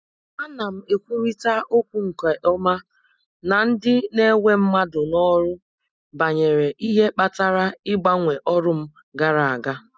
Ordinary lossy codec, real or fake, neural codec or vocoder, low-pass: none; real; none; none